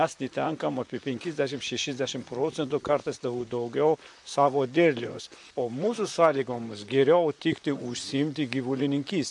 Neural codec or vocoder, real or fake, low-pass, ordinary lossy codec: vocoder, 44.1 kHz, 128 mel bands, Pupu-Vocoder; fake; 10.8 kHz; MP3, 64 kbps